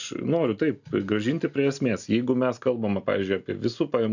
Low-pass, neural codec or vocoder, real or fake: 7.2 kHz; none; real